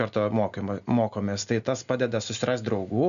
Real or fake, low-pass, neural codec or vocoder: real; 7.2 kHz; none